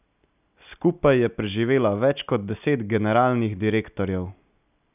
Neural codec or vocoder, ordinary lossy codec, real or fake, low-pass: none; none; real; 3.6 kHz